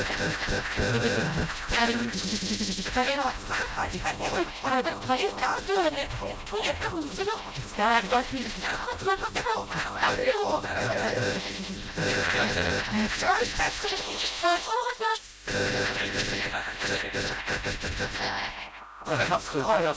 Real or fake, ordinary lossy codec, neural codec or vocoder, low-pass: fake; none; codec, 16 kHz, 0.5 kbps, FreqCodec, smaller model; none